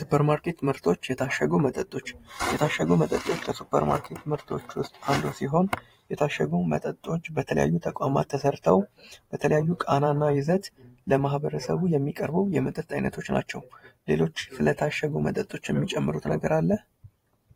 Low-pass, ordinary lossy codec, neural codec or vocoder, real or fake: 19.8 kHz; AAC, 48 kbps; vocoder, 44.1 kHz, 128 mel bands every 512 samples, BigVGAN v2; fake